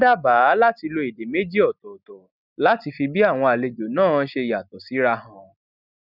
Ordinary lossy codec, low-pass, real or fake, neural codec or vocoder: none; 5.4 kHz; real; none